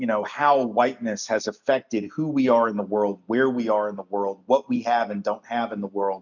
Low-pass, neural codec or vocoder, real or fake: 7.2 kHz; none; real